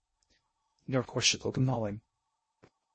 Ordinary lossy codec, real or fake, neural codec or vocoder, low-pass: MP3, 32 kbps; fake; codec, 16 kHz in and 24 kHz out, 0.6 kbps, FocalCodec, streaming, 2048 codes; 9.9 kHz